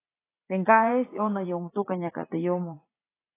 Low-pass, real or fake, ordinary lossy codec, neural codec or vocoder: 3.6 kHz; fake; AAC, 16 kbps; vocoder, 44.1 kHz, 80 mel bands, Vocos